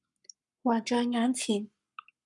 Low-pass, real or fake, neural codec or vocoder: 10.8 kHz; fake; codec, 44.1 kHz, 7.8 kbps, Pupu-Codec